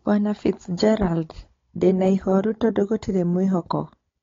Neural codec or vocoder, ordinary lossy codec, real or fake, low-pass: codec, 16 kHz, 16 kbps, FunCodec, trained on Chinese and English, 50 frames a second; AAC, 32 kbps; fake; 7.2 kHz